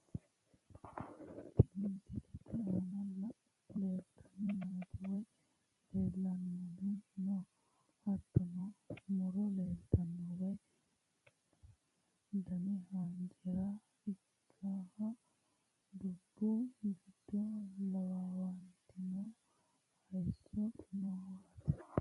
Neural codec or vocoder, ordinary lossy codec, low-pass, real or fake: vocoder, 44.1 kHz, 128 mel bands every 256 samples, BigVGAN v2; MP3, 48 kbps; 14.4 kHz; fake